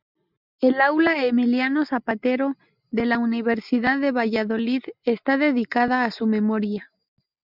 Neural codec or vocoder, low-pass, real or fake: none; 5.4 kHz; real